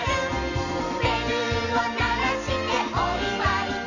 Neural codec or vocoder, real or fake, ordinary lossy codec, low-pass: none; real; none; 7.2 kHz